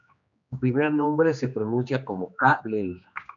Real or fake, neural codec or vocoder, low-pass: fake; codec, 16 kHz, 2 kbps, X-Codec, HuBERT features, trained on general audio; 7.2 kHz